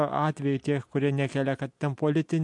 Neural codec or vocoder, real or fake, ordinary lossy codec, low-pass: none; real; MP3, 64 kbps; 10.8 kHz